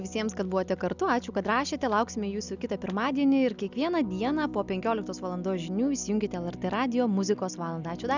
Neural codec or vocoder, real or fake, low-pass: none; real; 7.2 kHz